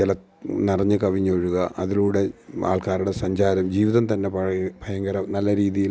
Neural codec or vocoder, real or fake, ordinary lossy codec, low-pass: none; real; none; none